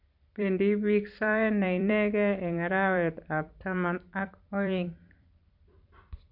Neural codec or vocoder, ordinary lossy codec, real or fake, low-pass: vocoder, 44.1 kHz, 128 mel bands every 256 samples, BigVGAN v2; none; fake; 5.4 kHz